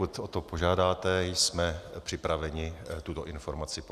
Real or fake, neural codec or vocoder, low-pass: real; none; 14.4 kHz